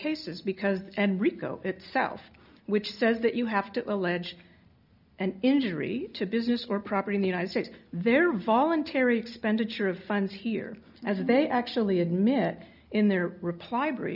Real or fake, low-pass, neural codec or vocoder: real; 5.4 kHz; none